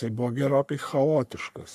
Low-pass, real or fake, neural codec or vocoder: 14.4 kHz; fake; codec, 44.1 kHz, 3.4 kbps, Pupu-Codec